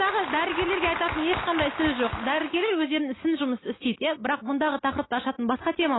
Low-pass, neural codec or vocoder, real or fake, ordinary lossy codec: 7.2 kHz; none; real; AAC, 16 kbps